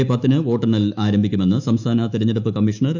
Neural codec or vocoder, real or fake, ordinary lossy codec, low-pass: autoencoder, 48 kHz, 128 numbers a frame, DAC-VAE, trained on Japanese speech; fake; none; 7.2 kHz